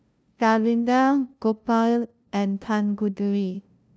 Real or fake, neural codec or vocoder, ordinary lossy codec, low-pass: fake; codec, 16 kHz, 0.5 kbps, FunCodec, trained on LibriTTS, 25 frames a second; none; none